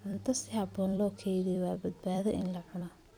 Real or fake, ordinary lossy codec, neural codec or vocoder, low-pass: fake; none; vocoder, 44.1 kHz, 128 mel bands every 256 samples, BigVGAN v2; none